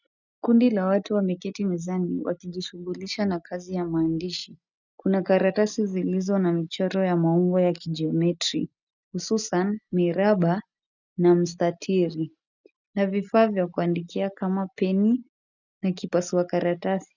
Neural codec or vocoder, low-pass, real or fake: none; 7.2 kHz; real